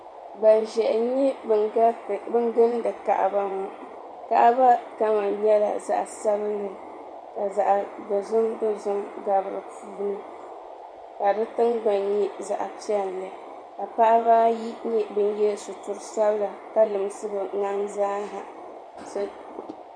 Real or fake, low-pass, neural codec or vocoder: fake; 9.9 kHz; vocoder, 24 kHz, 100 mel bands, Vocos